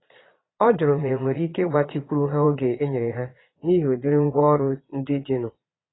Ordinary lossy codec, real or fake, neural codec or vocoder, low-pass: AAC, 16 kbps; fake; vocoder, 22.05 kHz, 80 mel bands, Vocos; 7.2 kHz